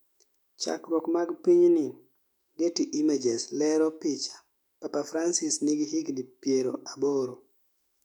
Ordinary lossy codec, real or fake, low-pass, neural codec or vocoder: none; fake; 19.8 kHz; autoencoder, 48 kHz, 128 numbers a frame, DAC-VAE, trained on Japanese speech